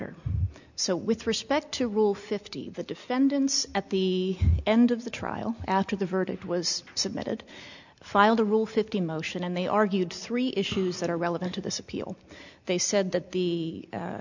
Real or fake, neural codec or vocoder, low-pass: real; none; 7.2 kHz